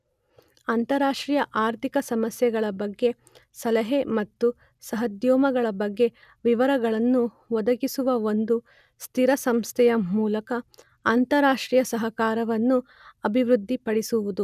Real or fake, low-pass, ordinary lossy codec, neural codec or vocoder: real; 14.4 kHz; none; none